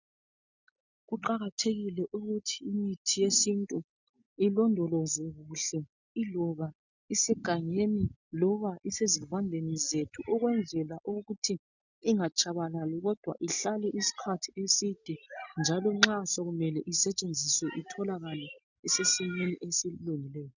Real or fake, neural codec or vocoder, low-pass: real; none; 7.2 kHz